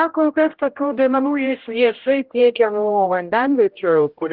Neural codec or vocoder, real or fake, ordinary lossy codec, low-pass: codec, 16 kHz, 0.5 kbps, X-Codec, HuBERT features, trained on general audio; fake; Opus, 16 kbps; 5.4 kHz